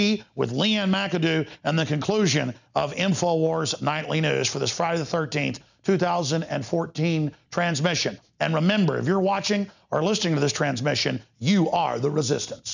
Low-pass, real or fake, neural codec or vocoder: 7.2 kHz; real; none